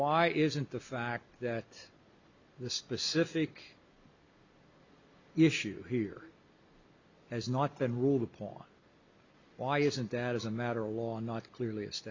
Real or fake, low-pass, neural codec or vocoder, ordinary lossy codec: real; 7.2 kHz; none; Opus, 64 kbps